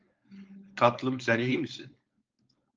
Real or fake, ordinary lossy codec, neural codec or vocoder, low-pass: fake; Opus, 24 kbps; codec, 16 kHz, 4.8 kbps, FACodec; 7.2 kHz